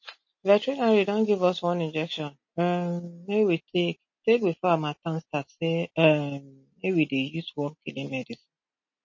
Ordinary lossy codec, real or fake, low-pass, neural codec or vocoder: MP3, 32 kbps; real; 7.2 kHz; none